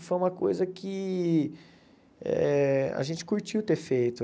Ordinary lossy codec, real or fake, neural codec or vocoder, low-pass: none; real; none; none